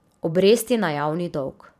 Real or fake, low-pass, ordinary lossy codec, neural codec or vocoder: fake; 14.4 kHz; none; vocoder, 44.1 kHz, 128 mel bands every 512 samples, BigVGAN v2